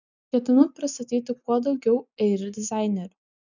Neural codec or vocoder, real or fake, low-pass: none; real; 7.2 kHz